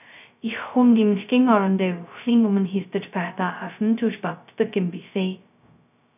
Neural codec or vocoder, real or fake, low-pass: codec, 16 kHz, 0.2 kbps, FocalCodec; fake; 3.6 kHz